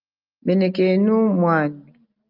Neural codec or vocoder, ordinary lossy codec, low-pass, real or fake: none; Opus, 24 kbps; 5.4 kHz; real